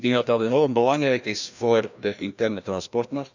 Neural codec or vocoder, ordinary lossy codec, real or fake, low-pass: codec, 16 kHz, 1 kbps, FreqCodec, larger model; none; fake; 7.2 kHz